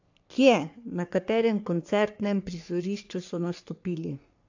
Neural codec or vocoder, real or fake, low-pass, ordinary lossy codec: codec, 44.1 kHz, 3.4 kbps, Pupu-Codec; fake; 7.2 kHz; AAC, 48 kbps